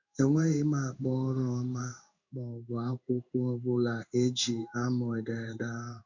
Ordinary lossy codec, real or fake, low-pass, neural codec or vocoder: none; fake; 7.2 kHz; codec, 16 kHz in and 24 kHz out, 1 kbps, XY-Tokenizer